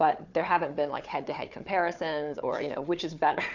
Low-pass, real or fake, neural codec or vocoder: 7.2 kHz; fake; codec, 16 kHz, 4 kbps, FunCodec, trained on Chinese and English, 50 frames a second